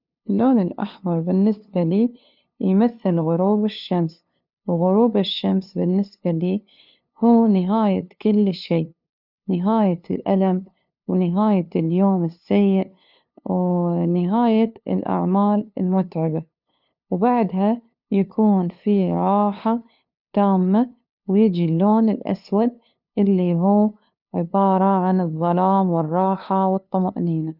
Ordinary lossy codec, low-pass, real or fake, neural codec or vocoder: none; 5.4 kHz; fake; codec, 16 kHz, 2 kbps, FunCodec, trained on LibriTTS, 25 frames a second